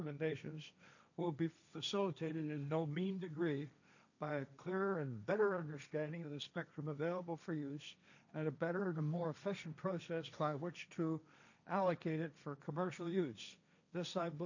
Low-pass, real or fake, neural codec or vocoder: 7.2 kHz; fake; codec, 16 kHz, 1.1 kbps, Voila-Tokenizer